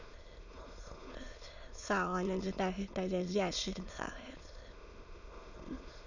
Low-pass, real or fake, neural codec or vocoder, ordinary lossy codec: 7.2 kHz; fake; autoencoder, 22.05 kHz, a latent of 192 numbers a frame, VITS, trained on many speakers; none